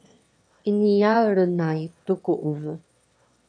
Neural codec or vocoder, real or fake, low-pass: autoencoder, 22.05 kHz, a latent of 192 numbers a frame, VITS, trained on one speaker; fake; 9.9 kHz